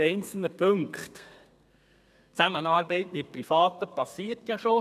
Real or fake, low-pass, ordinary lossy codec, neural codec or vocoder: fake; 14.4 kHz; none; codec, 32 kHz, 1.9 kbps, SNAC